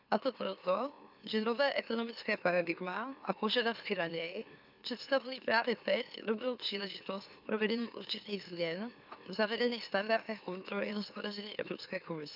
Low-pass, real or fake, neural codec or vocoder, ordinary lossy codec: 5.4 kHz; fake; autoencoder, 44.1 kHz, a latent of 192 numbers a frame, MeloTTS; none